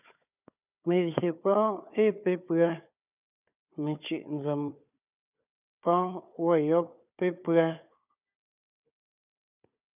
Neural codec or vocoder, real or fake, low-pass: codec, 16 kHz, 4 kbps, FunCodec, trained on Chinese and English, 50 frames a second; fake; 3.6 kHz